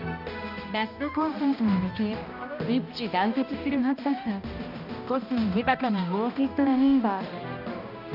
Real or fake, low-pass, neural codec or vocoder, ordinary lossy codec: fake; 5.4 kHz; codec, 16 kHz, 1 kbps, X-Codec, HuBERT features, trained on balanced general audio; none